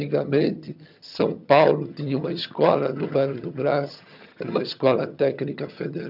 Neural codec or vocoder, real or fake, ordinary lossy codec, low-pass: vocoder, 22.05 kHz, 80 mel bands, HiFi-GAN; fake; none; 5.4 kHz